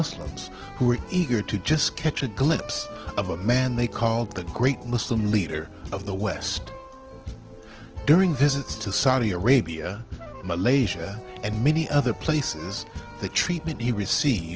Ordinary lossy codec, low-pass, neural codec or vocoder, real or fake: Opus, 16 kbps; 7.2 kHz; none; real